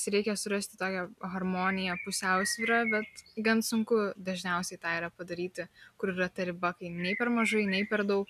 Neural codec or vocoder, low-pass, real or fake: none; 14.4 kHz; real